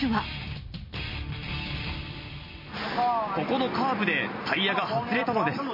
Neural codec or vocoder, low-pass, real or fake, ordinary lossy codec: vocoder, 44.1 kHz, 128 mel bands every 256 samples, BigVGAN v2; 5.4 kHz; fake; MP3, 24 kbps